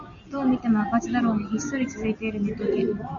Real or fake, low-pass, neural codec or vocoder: real; 7.2 kHz; none